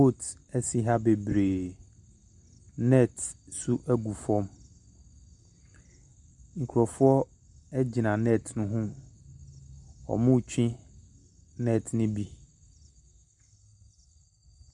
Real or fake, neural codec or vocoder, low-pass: real; none; 10.8 kHz